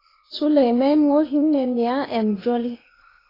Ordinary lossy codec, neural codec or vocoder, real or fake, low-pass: AAC, 24 kbps; codec, 16 kHz, 0.8 kbps, ZipCodec; fake; 5.4 kHz